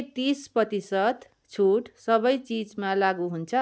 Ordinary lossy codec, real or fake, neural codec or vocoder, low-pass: none; real; none; none